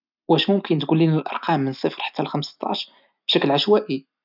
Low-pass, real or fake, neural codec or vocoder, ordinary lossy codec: 5.4 kHz; real; none; none